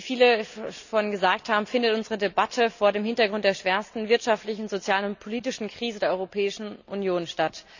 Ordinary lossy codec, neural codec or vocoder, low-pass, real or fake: none; none; 7.2 kHz; real